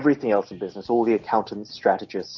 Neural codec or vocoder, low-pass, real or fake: none; 7.2 kHz; real